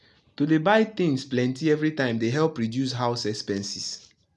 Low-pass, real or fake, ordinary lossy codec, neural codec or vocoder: none; real; none; none